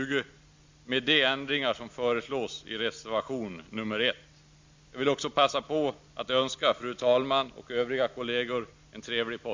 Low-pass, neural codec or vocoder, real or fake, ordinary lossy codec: 7.2 kHz; none; real; MP3, 64 kbps